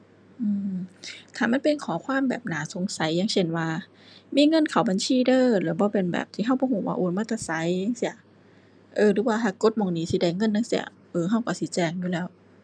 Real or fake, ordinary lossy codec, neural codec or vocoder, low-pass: real; none; none; 9.9 kHz